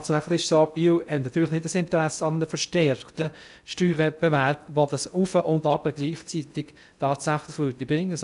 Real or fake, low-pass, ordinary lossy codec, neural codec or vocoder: fake; 10.8 kHz; none; codec, 16 kHz in and 24 kHz out, 0.6 kbps, FocalCodec, streaming, 2048 codes